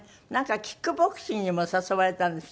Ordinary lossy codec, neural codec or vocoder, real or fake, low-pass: none; none; real; none